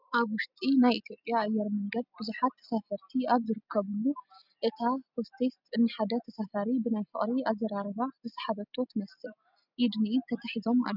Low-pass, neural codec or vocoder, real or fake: 5.4 kHz; none; real